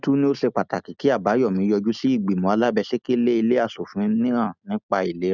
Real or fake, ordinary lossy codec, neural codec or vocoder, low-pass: real; none; none; 7.2 kHz